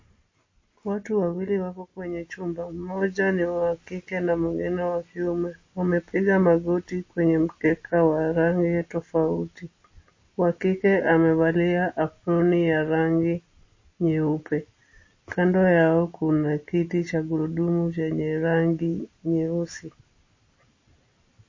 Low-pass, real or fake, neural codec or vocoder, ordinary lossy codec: 7.2 kHz; real; none; MP3, 32 kbps